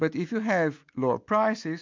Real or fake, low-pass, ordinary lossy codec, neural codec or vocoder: real; 7.2 kHz; MP3, 64 kbps; none